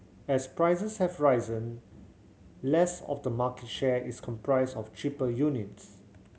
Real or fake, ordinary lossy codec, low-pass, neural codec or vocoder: real; none; none; none